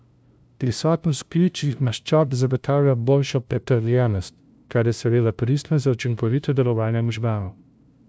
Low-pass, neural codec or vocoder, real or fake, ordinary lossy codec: none; codec, 16 kHz, 0.5 kbps, FunCodec, trained on LibriTTS, 25 frames a second; fake; none